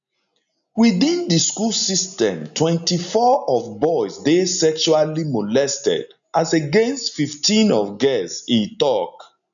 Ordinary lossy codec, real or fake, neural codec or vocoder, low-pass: none; real; none; 7.2 kHz